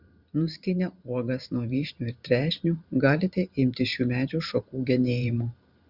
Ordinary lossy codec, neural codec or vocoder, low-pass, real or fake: Opus, 64 kbps; vocoder, 44.1 kHz, 128 mel bands every 512 samples, BigVGAN v2; 5.4 kHz; fake